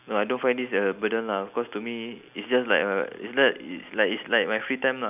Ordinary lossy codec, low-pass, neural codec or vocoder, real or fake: none; 3.6 kHz; none; real